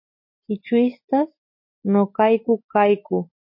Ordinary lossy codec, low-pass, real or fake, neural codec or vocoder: MP3, 32 kbps; 5.4 kHz; real; none